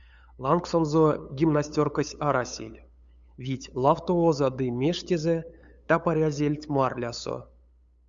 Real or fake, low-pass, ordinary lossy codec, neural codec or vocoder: fake; 7.2 kHz; Opus, 64 kbps; codec, 16 kHz, 8 kbps, FunCodec, trained on LibriTTS, 25 frames a second